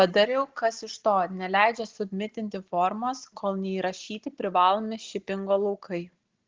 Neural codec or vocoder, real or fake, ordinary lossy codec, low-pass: none; real; Opus, 16 kbps; 7.2 kHz